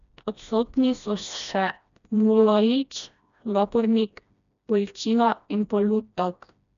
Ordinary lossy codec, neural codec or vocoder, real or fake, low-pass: none; codec, 16 kHz, 1 kbps, FreqCodec, smaller model; fake; 7.2 kHz